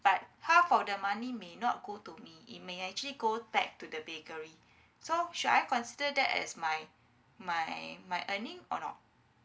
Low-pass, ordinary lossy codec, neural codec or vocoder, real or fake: none; none; none; real